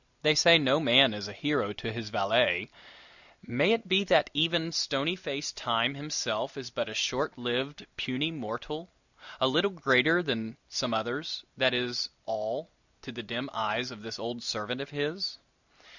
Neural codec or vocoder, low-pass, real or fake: none; 7.2 kHz; real